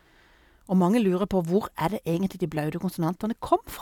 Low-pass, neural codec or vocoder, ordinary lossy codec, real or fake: 19.8 kHz; none; none; real